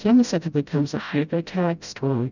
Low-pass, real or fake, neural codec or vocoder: 7.2 kHz; fake; codec, 16 kHz, 0.5 kbps, FreqCodec, smaller model